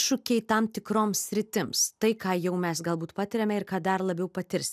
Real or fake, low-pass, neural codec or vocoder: real; 14.4 kHz; none